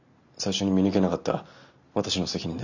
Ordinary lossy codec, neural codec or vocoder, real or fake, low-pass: none; none; real; 7.2 kHz